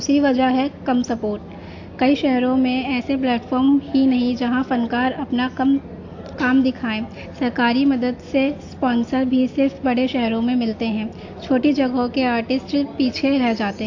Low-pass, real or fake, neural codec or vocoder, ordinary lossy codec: 7.2 kHz; real; none; AAC, 48 kbps